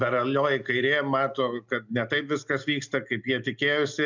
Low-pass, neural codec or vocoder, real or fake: 7.2 kHz; none; real